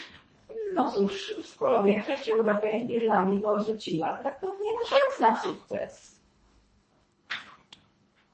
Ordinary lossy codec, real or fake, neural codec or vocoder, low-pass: MP3, 32 kbps; fake; codec, 24 kHz, 1.5 kbps, HILCodec; 9.9 kHz